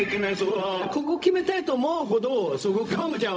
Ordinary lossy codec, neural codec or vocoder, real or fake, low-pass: none; codec, 16 kHz, 0.4 kbps, LongCat-Audio-Codec; fake; none